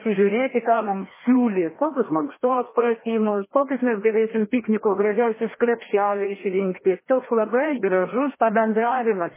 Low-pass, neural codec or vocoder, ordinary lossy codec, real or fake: 3.6 kHz; codec, 16 kHz, 1 kbps, FreqCodec, larger model; MP3, 16 kbps; fake